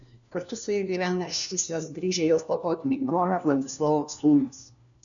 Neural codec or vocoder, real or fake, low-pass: codec, 16 kHz, 1 kbps, FunCodec, trained on LibriTTS, 50 frames a second; fake; 7.2 kHz